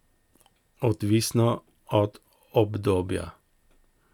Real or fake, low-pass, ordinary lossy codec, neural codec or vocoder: real; 19.8 kHz; none; none